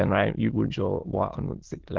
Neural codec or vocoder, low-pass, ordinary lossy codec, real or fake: autoencoder, 22.05 kHz, a latent of 192 numbers a frame, VITS, trained on many speakers; 7.2 kHz; Opus, 16 kbps; fake